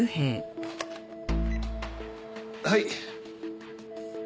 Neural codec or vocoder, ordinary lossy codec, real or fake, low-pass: none; none; real; none